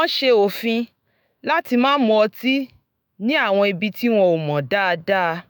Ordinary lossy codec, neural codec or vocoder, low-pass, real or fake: none; autoencoder, 48 kHz, 128 numbers a frame, DAC-VAE, trained on Japanese speech; none; fake